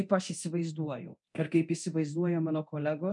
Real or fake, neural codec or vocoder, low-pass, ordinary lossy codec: fake; codec, 24 kHz, 0.9 kbps, DualCodec; 10.8 kHz; MP3, 64 kbps